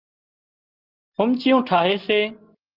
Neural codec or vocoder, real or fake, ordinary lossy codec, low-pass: none; real; Opus, 32 kbps; 5.4 kHz